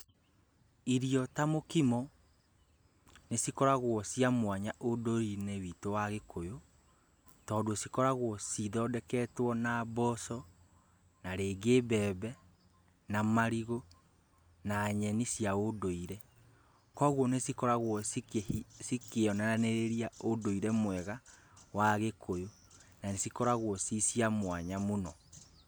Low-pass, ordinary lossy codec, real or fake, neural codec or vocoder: none; none; real; none